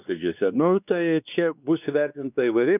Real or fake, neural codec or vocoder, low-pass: fake; codec, 16 kHz, 2 kbps, X-Codec, WavLM features, trained on Multilingual LibriSpeech; 3.6 kHz